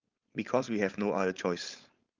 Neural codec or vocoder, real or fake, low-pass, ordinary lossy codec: codec, 16 kHz, 4.8 kbps, FACodec; fake; 7.2 kHz; Opus, 32 kbps